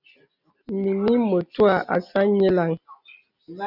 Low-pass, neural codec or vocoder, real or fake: 5.4 kHz; none; real